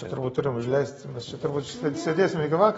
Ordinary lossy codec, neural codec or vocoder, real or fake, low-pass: AAC, 24 kbps; none; real; 19.8 kHz